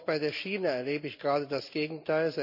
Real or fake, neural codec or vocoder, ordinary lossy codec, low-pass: real; none; none; 5.4 kHz